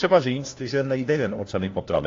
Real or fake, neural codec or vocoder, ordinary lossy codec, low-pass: fake; codec, 16 kHz, 1 kbps, FunCodec, trained on LibriTTS, 50 frames a second; AAC, 32 kbps; 7.2 kHz